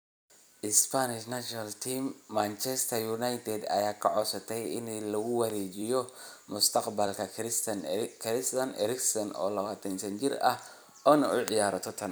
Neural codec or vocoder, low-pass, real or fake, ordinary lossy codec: vocoder, 44.1 kHz, 128 mel bands every 256 samples, BigVGAN v2; none; fake; none